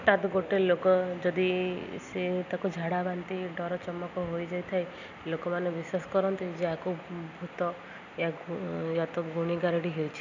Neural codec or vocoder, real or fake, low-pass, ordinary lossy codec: none; real; 7.2 kHz; none